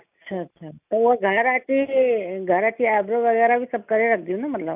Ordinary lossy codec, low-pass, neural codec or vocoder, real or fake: none; 3.6 kHz; none; real